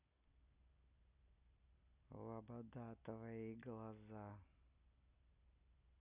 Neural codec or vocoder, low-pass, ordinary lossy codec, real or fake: none; 3.6 kHz; none; real